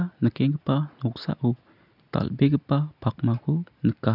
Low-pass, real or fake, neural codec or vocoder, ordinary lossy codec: 5.4 kHz; real; none; none